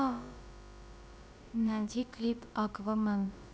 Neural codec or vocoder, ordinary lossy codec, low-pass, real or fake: codec, 16 kHz, about 1 kbps, DyCAST, with the encoder's durations; none; none; fake